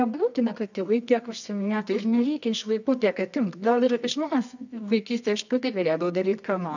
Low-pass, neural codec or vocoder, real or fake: 7.2 kHz; codec, 24 kHz, 0.9 kbps, WavTokenizer, medium music audio release; fake